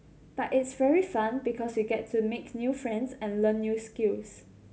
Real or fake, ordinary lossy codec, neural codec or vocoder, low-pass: real; none; none; none